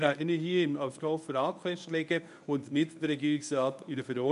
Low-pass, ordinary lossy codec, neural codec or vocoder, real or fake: 10.8 kHz; none; codec, 24 kHz, 0.9 kbps, WavTokenizer, medium speech release version 1; fake